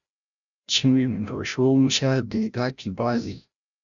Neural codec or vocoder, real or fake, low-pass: codec, 16 kHz, 0.5 kbps, FreqCodec, larger model; fake; 7.2 kHz